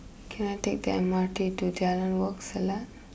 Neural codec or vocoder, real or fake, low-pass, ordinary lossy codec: none; real; none; none